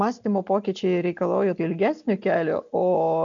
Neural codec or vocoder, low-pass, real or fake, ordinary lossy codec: none; 7.2 kHz; real; MP3, 96 kbps